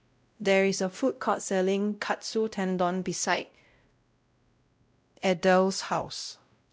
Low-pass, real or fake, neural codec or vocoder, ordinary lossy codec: none; fake; codec, 16 kHz, 0.5 kbps, X-Codec, WavLM features, trained on Multilingual LibriSpeech; none